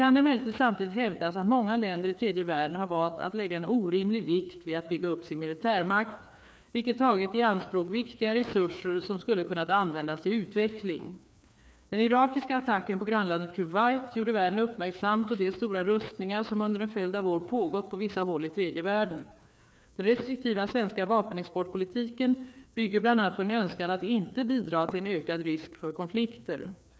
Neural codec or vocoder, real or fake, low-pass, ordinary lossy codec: codec, 16 kHz, 2 kbps, FreqCodec, larger model; fake; none; none